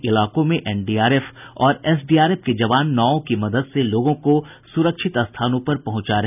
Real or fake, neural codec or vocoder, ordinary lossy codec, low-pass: real; none; none; 3.6 kHz